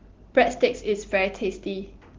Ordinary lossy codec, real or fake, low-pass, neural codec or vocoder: Opus, 16 kbps; real; 7.2 kHz; none